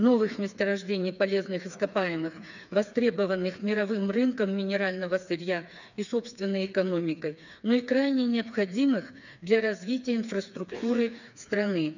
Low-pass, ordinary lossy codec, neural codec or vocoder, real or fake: 7.2 kHz; none; codec, 16 kHz, 4 kbps, FreqCodec, smaller model; fake